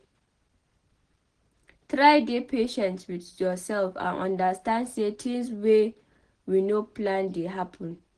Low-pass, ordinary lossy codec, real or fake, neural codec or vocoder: 9.9 kHz; Opus, 16 kbps; real; none